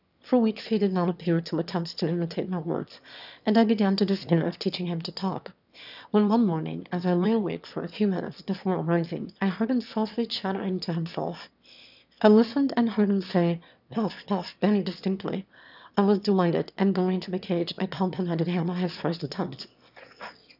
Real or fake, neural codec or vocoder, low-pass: fake; autoencoder, 22.05 kHz, a latent of 192 numbers a frame, VITS, trained on one speaker; 5.4 kHz